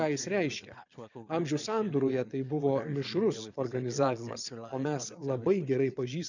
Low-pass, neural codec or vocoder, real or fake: 7.2 kHz; vocoder, 22.05 kHz, 80 mel bands, Vocos; fake